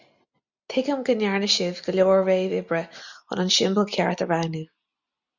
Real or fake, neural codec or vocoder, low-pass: real; none; 7.2 kHz